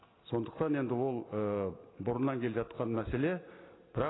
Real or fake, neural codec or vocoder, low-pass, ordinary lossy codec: real; none; 7.2 kHz; AAC, 16 kbps